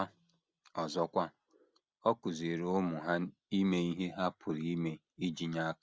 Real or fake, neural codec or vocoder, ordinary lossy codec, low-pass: real; none; none; none